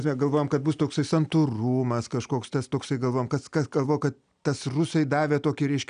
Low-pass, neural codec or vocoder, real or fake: 9.9 kHz; none; real